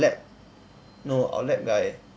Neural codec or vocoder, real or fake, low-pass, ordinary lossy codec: none; real; none; none